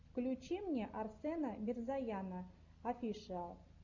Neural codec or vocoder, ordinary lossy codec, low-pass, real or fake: none; MP3, 64 kbps; 7.2 kHz; real